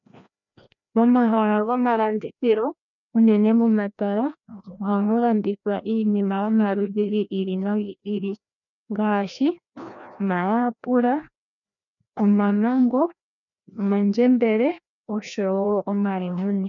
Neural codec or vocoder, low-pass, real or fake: codec, 16 kHz, 1 kbps, FreqCodec, larger model; 7.2 kHz; fake